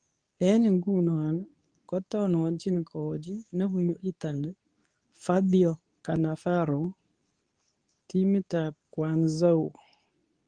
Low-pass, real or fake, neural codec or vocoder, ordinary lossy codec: 9.9 kHz; fake; codec, 24 kHz, 0.9 kbps, WavTokenizer, medium speech release version 2; Opus, 32 kbps